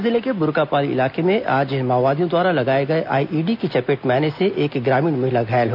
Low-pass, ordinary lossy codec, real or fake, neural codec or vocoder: 5.4 kHz; none; real; none